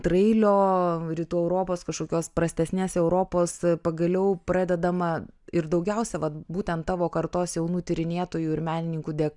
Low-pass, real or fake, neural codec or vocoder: 10.8 kHz; real; none